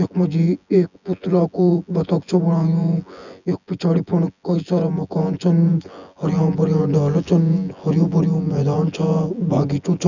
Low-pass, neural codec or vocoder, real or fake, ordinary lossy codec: 7.2 kHz; vocoder, 24 kHz, 100 mel bands, Vocos; fake; none